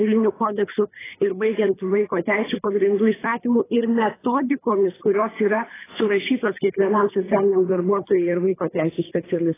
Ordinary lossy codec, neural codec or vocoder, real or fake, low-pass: AAC, 16 kbps; codec, 24 kHz, 3 kbps, HILCodec; fake; 3.6 kHz